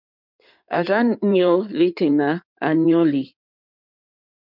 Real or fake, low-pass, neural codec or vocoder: fake; 5.4 kHz; codec, 16 kHz in and 24 kHz out, 2.2 kbps, FireRedTTS-2 codec